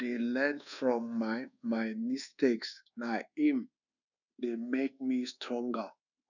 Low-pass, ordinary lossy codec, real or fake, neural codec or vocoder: 7.2 kHz; none; fake; codec, 24 kHz, 1.2 kbps, DualCodec